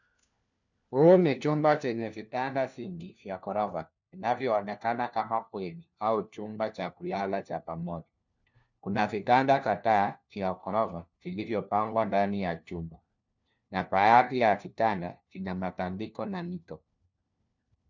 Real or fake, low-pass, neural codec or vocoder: fake; 7.2 kHz; codec, 16 kHz, 1 kbps, FunCodec, trained on LibriTTS, 50 frames a second